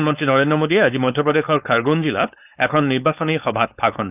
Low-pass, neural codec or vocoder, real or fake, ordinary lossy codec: 3.6 kHz; codec, 16 kHz, 4.8 kbps, FACodec; fake; none